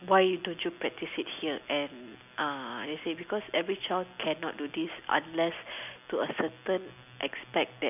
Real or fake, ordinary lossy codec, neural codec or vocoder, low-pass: real; none; none; 3.6 kHz